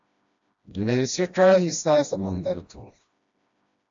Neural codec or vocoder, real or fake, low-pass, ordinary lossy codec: codec, 16 kHz, 1 kbps, FreqCodec, smaller model; fake; 7.2 kHz; AAC, 64 kbps